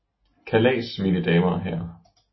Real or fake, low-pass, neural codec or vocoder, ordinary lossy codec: real; 7.2 kHz; none; MP3, 24 kbps